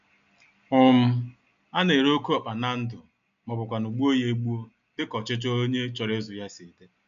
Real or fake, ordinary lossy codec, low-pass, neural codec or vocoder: real; none; 7.2 kHz; none